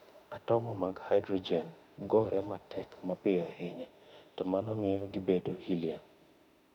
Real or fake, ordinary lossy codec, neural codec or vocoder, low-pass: fake; none; autoencoder, 48 kHz, 32 numbers a frame, DAC-VAE, trained on Japanese speech; 19.8 kHz